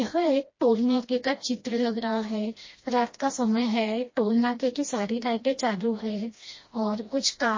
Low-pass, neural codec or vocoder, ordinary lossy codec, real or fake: 7.2 kHz; codec, 16 kHz, 1 kbps, FreqCodec, smaller model; MP3, 32 kbps; fake